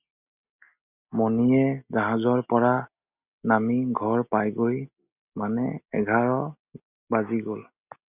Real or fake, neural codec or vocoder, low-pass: real; none; 3.6 kHz